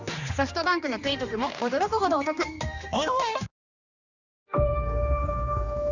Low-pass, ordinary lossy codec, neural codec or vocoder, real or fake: 7.2 kHz; none; codec, 16 kHz, 2 kbps, X-Codec, HuBERT features, trained on general audio; fake